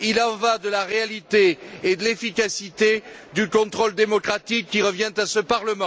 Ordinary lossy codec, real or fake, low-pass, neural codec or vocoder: none; real; none; none